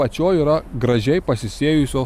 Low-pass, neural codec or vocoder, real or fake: 14.4 kHz; none; real